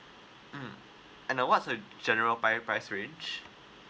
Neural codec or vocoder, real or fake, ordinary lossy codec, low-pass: none; real; none; none